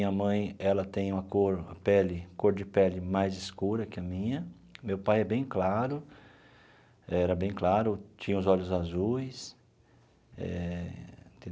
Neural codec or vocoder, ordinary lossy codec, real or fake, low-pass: none; none; real; none